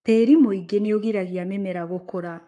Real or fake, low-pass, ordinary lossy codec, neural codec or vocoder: fake; 10.8 kHz; AAC, 64 kbps; codec, 44.1 kHz, 7.8 kbps, Pupu-Codec